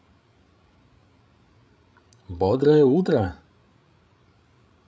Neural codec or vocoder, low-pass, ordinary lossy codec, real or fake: codec, 16 kHz, 16 kbps, FreqCodec, larger model; none; none; fake